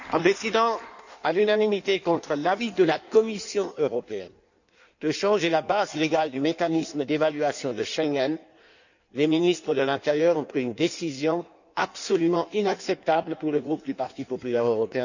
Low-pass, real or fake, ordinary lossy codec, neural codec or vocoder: 7.2 kHz; fake; none; codec, 16 kHz in and 24 kHz out, 1.1 kbps, FireRedTTS-2 codec